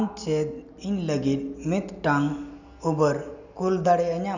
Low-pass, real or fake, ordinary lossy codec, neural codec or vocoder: 7.2 kHz; real; none; none